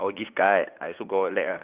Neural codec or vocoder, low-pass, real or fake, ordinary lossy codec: codec, 16 kHz, 8 kbps, FunCodec, trained on LibriTTS, 25 frames a second; 3.6 kHz; fake; Opus, 32 kbps